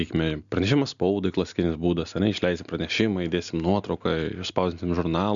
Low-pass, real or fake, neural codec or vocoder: 7.2 kHz; real; none